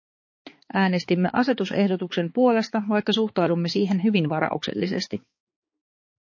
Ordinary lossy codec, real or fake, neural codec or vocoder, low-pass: MP3, 32 kbps; fake; codec, 16 kHz, 4 kbps, X-Codec, HuBERT features, trained on balanced general audio; 7.2 kHz